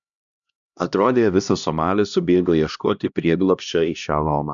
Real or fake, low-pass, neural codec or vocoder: fake; 7.2 kHz; codec, 16 kHz, 1 kbps, X-Codec, HuBERT features, trained on LibriSpeech